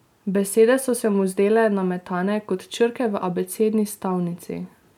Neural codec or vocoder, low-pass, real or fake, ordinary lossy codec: none; 19.8 kHz; real; none